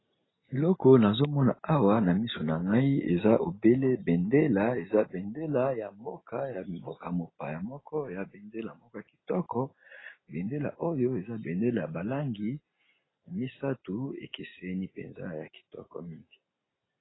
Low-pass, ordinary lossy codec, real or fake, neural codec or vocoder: 7.2 kHz; AAC, 16 kbps; real; none